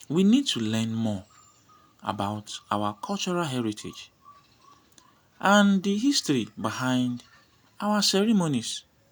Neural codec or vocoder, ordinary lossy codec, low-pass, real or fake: none; none; none; real